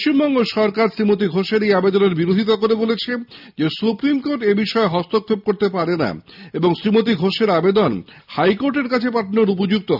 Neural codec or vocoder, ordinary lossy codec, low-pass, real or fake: none; none; 5.4 kHz; real